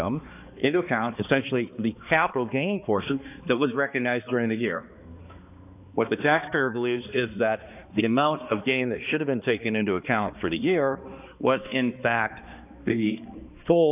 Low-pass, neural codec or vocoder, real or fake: 3.6 kHz; codec, 16 kHz, 2 kbps, X-Codec, HuBERT features, trained on balanced general audio; fake